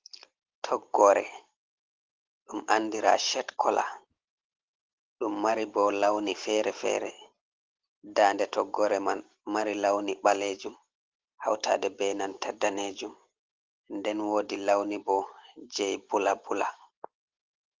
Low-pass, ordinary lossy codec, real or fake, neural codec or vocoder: 7.2 kHz; Opus, 16 kbps; real; none